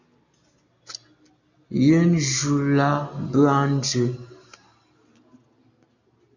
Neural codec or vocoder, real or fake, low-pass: none; real; 7.2 kHz